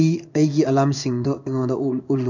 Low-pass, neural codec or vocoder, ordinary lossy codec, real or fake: 7.2 kHz; codec, 16 kHz in and 24 kHz out, 1 kbps, XY-Tokenizer; none; fake